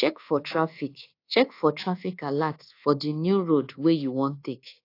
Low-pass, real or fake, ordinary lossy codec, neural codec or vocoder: 5.4 kHz; fake; AAC, 32 kbps; codec, 24 kHz, 1.2 kbps, DualCodec